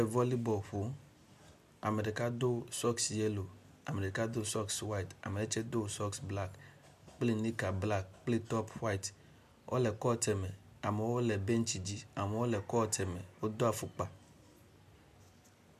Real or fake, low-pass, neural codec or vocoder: real; 14.4 kHz; none